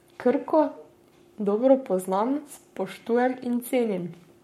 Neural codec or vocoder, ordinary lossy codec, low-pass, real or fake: codec, 44.1 kHz, 7.8 kbps, Pupu-Codec; MP3, 64 kbps; 19.8 kHz; fake